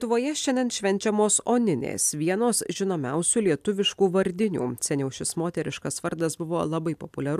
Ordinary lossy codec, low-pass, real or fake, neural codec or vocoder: AAC, 96 kbps; 14.4 kHz; real; none